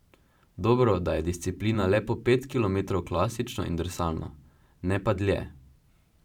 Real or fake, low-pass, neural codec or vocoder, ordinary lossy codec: fake; 19.8 kHz; vocoder, 44.1 kHz, 128 mel bands every 512 samples, BigVGAN v2; none